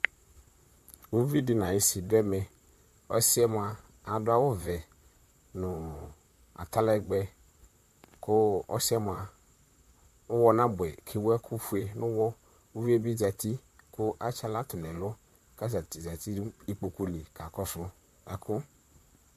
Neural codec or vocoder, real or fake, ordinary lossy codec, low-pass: vocoder, 44.1 kHz, 128 mel bands, Pupu-Vocoder; fake; MP3, 64 kbps; 14.4 kHz